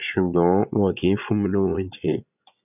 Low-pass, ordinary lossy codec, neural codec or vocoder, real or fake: 3.6 kHz; none; vocoder, 22.05 kHz, 80 mel bands, Vocos; fake